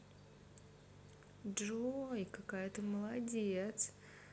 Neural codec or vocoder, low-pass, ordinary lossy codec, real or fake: none; none; none; real